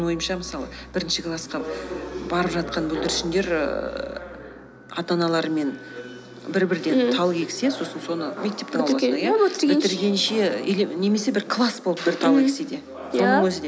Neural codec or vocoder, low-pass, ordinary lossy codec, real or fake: none; none; none; real